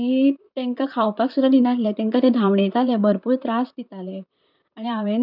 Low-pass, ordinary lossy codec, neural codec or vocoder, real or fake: 5.4 kHz; none; vocoder, 44.1 kHz, 128 mel bands, Pupu-Vocoder; fake